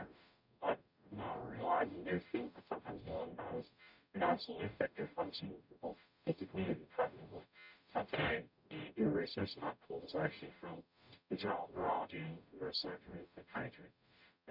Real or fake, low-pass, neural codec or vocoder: fake; 5.4 kHz; codec, 44.1 kHz, 0.9 kbps, DAC